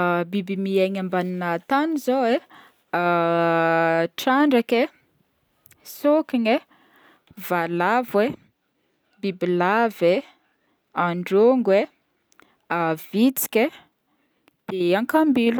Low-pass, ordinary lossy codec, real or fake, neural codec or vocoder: none; none; real; none